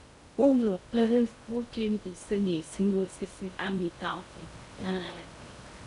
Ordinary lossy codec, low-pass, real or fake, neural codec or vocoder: none; 10.8 kHz; fake; codec, 16 kHz in and 24 kHz out, 0.6 kbps, FocalCodec, streaming, 2048 codes